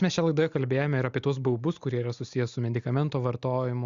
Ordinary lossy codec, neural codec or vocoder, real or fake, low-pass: Opus, 64 kbps; none; real; 7.2 kHz